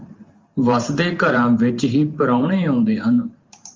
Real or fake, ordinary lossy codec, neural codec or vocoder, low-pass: fake; Opus, 32 kbps; vocoder, 44.1 kHz, 128 mel bands every 512 samples, BigVGAN v2; 7.2 kHz